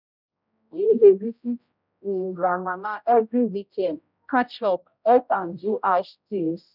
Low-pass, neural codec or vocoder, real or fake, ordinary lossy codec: 5.4 kHz; codec, 16 kHz, 0.5 kbps, X-Codec, HuBERT features, trained on general audio; fake; none